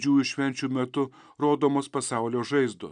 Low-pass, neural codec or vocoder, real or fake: 9.9 kHz; none; real